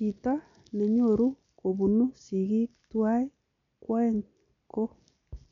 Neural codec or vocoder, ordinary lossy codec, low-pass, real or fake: none; none; 7.2 kHz; real